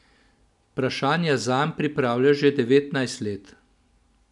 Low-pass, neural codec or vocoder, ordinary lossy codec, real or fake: 10.8 kHz; none; none; real